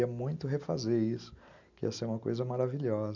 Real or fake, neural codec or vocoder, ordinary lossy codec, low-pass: real; none; none; 7.2 kHz